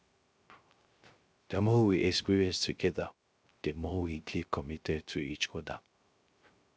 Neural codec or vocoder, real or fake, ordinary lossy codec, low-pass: codec, 16 kHz, 0.3 kbps, FocalCodec; fake; none; none